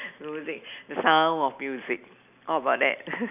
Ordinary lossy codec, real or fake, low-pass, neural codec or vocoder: none; real; 3.6 kHz; none